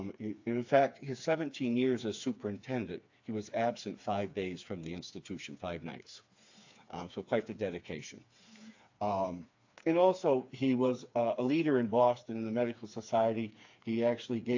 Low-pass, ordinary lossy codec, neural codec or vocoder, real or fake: 7.2 kHz; AAC, 48 kbps; codec, 16 kHz, 4 kbps, FreqCodec, smaller model; fake